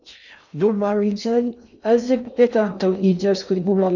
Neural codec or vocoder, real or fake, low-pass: codec, 16 kHz in and 24 kHz out, 0.6 kbps, FocalCodec, streaming, 2048 codes; fake; 7.2 kHz